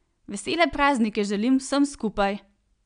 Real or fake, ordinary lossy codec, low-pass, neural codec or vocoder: real; none; 9.9 kHz; none